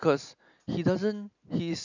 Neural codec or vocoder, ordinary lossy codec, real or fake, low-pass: none; none; real; 7.2 kHz